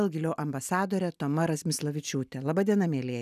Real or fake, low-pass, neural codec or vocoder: real; 14.4 kHz; none